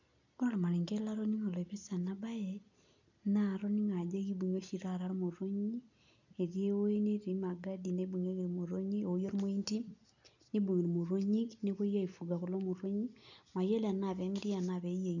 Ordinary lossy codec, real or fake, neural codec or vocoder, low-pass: none; real; none; 7.2 kHz